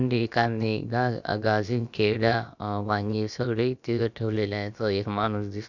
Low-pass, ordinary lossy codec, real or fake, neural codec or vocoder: 7.2 kHz; none; fake; codec, 16 kHz, about 1 kbps, DyCAST, with the encoder's durations